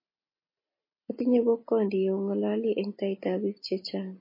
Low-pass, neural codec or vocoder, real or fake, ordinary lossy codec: 5.4 kHz; none; real; MP3, 24 kbps